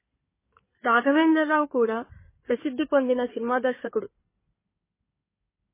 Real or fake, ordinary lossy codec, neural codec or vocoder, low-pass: fake; MP3, 16 kbps; codec, 16 kHz in and 24 kHz out, 2.2 kbps, FireRedTTS-2 codec; 3.6 kHz